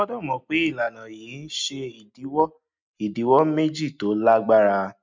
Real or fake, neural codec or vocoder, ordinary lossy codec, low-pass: real; none; MP3, 64 kbps; 7.2 kHz